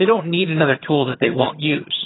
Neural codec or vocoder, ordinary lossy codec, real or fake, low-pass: vocoder, 22.05 kHz, 80 mel bands, HiFi-GAN; AAC, 16 kbps; fake; 7.2 kHz